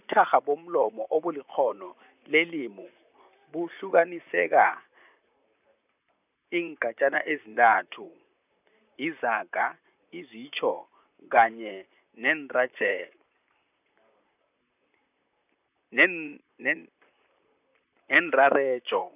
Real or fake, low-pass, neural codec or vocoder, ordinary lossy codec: fake; 3.6 kHz; vocoder, 44.1 kHz, 128 mel bands, Pupu-Vocoder; none